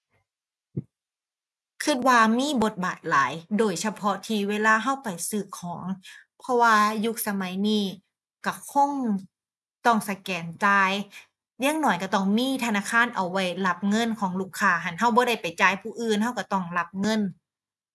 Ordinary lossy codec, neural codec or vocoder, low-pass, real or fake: none; none; none; real